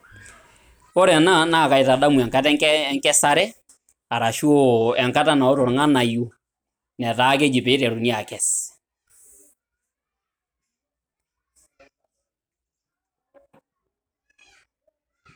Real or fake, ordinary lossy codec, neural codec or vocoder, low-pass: real; none; none; none